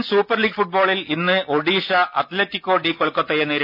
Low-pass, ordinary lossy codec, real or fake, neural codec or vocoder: 5.4 kHz; none; real; none